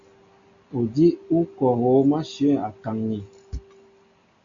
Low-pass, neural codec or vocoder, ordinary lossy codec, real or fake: 7.2 kHz; none; AAC, 64 kbps; real